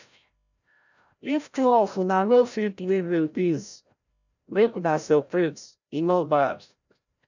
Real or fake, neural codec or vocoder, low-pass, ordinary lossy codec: fake; codec, 16 kHz, 0.5 kbps, FreqCodec, larger model; 7.2 kHz; none